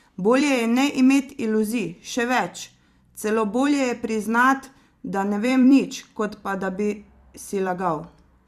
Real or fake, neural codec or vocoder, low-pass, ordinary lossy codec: fake; vocoder, 44.1 kHz, 128 mel bands every 256 samples, BigVGAN v2; 14.4 kHz; Opus, 64 kbps